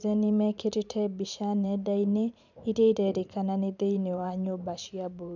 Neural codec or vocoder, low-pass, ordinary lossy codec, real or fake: vocoder, 44.1 kHz, 128 mel bands every 512 samples, BigVGAN v2; 7.2 kHz; none; fake